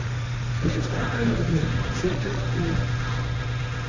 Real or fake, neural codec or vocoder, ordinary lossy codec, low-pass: fake; codec, 16 kHz, 1.1 kbps, Voila-Tokenizer; none; 7.2 kHz